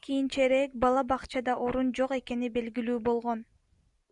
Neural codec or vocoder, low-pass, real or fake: none; 10.8 kHz; real